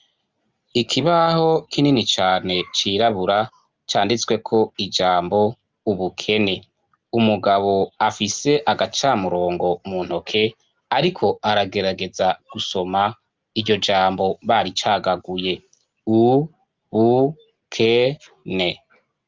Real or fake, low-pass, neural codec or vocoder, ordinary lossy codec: real; 7.2 kHz; none; Opus, 32 kbps